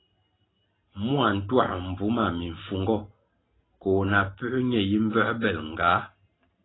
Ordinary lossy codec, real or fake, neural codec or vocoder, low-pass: AAC, 16 kbps; real; none; 7.2 kHz